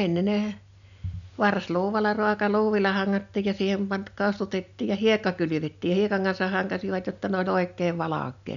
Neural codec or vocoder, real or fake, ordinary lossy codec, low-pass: none; real; none; 7.2 kHz